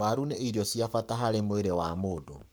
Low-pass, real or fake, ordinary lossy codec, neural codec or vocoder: none; fake; none; codec, 44.1 kHz, 7.8 kbps, Pupu-Codec